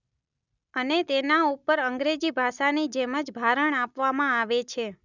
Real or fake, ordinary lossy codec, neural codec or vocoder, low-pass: real; none; none; 7.2 kHz